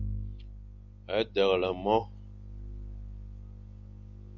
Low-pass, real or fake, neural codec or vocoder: 7.2 kHz; real; none